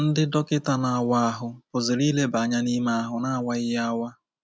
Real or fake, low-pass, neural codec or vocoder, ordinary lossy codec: real; none; none; none